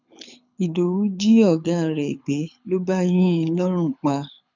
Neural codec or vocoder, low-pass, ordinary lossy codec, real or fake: codec, 24 kHz, 6 kbps, HILCodec; 7.2 kHz; none; fake